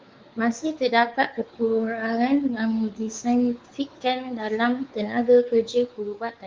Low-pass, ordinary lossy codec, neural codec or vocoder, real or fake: 7.2 kHz; Opus, 32 kbps; codec, 16 kHz, 4 kbps, X-Codec, WavLM features, trained on Multilingual LibriSpeech; fake